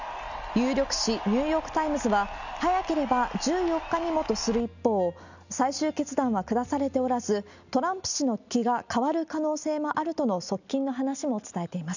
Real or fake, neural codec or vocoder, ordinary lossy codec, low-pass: real; none; none; 7.2 kHz